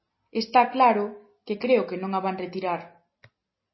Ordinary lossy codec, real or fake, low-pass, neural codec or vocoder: MP3, 24 kbps; real; 7.2 kHz; none